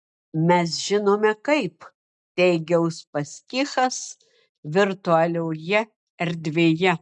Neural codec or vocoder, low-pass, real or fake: none; 10.8 kHz; real